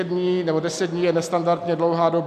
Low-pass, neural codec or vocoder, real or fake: 14.4 kHz; none; real